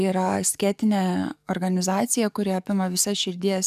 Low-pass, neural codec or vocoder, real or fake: 14.4 kHz; codec, 44.1 kHz, 7.8 kbps, DAC; fake